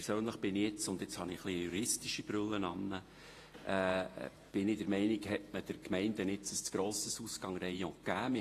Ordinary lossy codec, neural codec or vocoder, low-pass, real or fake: AAC, 48 kbps; vocoder, 48 kHz, 128 mel bands, Vocos; 14.4 kHz; fake